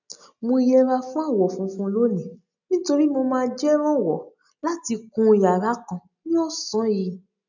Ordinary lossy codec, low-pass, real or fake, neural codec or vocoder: none; 7.2 kHz; real; none